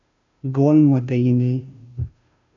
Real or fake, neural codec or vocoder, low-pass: fake; codec, 16 kHz, 0.5 kbps, FunCodec, trained on Chinese and English, 25 frames a second; 7.2 kHz